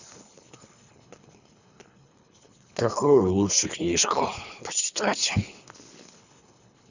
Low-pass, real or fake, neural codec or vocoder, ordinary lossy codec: 7.2 kHz; fake; codec, 24 kHz, 3 kbps, HILCodec; none